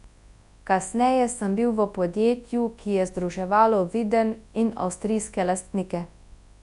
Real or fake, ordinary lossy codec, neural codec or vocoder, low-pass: fake; none; codec, 24 kHz, 0.9 kbps, WavTokenizer, large speech release; 10.8 kHz